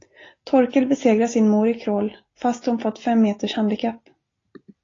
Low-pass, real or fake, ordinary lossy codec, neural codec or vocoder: 7.2 kHz; real; AAC, 32 kbps; none